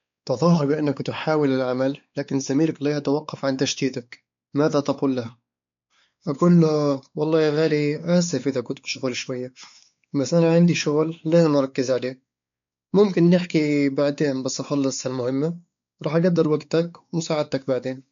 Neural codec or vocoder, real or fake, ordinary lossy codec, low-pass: codec, 16 kHz, 4 kbps, X-Codec, WavLM features, trained on Multilingual LibriSpeech; fake; MP3, 64 kbps; 7.2 kHz